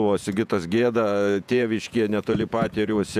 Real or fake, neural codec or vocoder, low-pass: fake; vocoder, 48 kHz, 128 mel bands, Vocos; 14.4 kHz